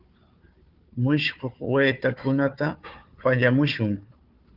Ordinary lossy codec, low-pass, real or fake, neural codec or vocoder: Opus, 32 kbps; 5.4 kHz; fake; codec, 16 kHz, 4 kbps, FunCodec, trained on Chinese and English, 50 frames a second